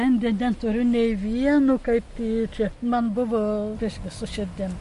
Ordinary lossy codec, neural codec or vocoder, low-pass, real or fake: MP3, 48 kbps; none; 14.4 kHz; real